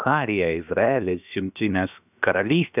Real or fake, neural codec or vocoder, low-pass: fake; codec, 16 kHz, about 1 kbps, DyCAST, with the encoder's durations; 3.6 kHz